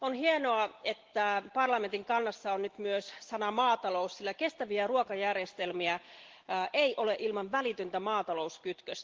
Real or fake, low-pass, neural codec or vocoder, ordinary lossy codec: real; 7.2 kHz; none; Opus, 16 kbps